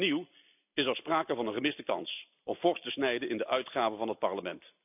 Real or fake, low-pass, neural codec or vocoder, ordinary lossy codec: real; 3.6 kHz; none; none